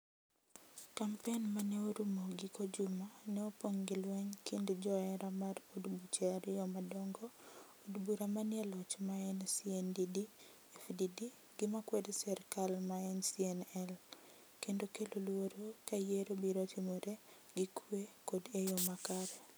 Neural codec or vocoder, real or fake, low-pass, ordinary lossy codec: none; real; none; none